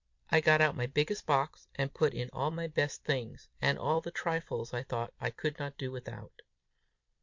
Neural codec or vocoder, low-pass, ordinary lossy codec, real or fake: vocoder, 44.1 kHz, 128 mel bands every 256 samples, BigVGAN v2; 7.2 kHz; MP3, 48 kbps; fake